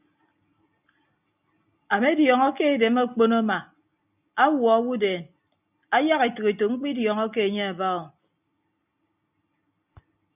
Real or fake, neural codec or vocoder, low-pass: real; none; 3.6 kHz